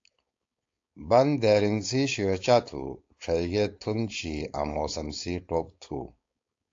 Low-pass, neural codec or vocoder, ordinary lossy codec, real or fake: 7.2 kHz; codec, 16 kHz, 4.8 kbps, FACodec; AAC, 48 kbps; fake